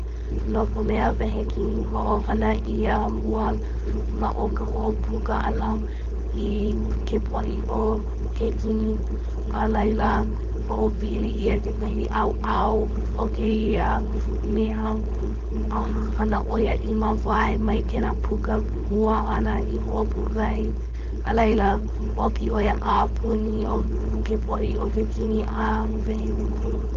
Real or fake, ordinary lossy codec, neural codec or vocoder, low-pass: fake; Opus, 32 kbps; codec, 16 kHz, 4.8 kbps, FACodec; 7.2 kHz